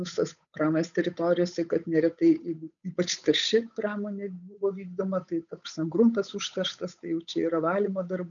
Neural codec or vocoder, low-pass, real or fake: codec, 16 kHz, 8 kbps, FunCodec, trained on Chinese and English, 25 frames a second; 7.2 kHz; fake